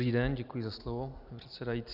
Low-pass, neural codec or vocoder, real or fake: 5.4 kHz; none; real